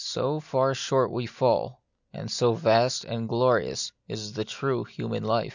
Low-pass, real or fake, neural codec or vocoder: 7.2 kHz; real; none